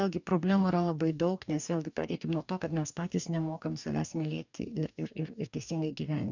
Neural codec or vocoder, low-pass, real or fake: codec, 44.1 kHz, 2.6 kbps, DAC; 7.2 kHz; fake